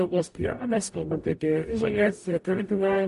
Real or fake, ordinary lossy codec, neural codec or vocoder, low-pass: fake; MP3, 48 kbps; codec, 44.1 kHz, 0.9 kbps, DAC; 14.4 kHz